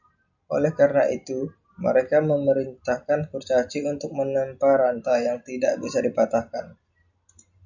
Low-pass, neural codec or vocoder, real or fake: 7.2 kHz; none; real